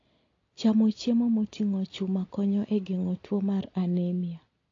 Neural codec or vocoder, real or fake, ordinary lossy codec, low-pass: none; real; AAC, 32 kbps; 7.2 kHz